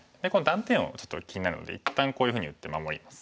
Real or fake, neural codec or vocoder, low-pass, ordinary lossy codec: real; none; none; none